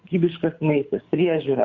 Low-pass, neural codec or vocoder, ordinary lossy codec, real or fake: 7.2 kHz; codec, 24 kHz, 6 kbps, HILCodec; Opus, 64 kbps; fake